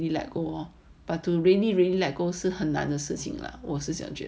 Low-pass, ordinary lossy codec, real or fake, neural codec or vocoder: none; none; real; none